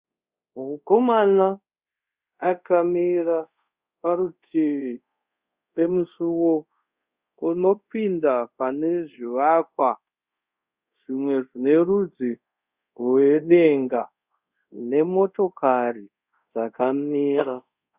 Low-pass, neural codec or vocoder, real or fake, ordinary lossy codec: 3.6 kHz; codec, 24 kHz, 0.5 kbps, DualCodec; fake; Opus, 64 kbps